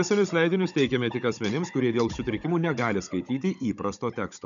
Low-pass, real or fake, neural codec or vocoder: 7.2 kHz; fake; codec, 16 kHz, 16 kbps, FunCodec, trained on Chinese and English, 50 frames a second